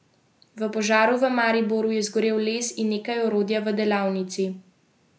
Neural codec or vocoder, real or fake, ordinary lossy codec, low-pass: none; real; none; none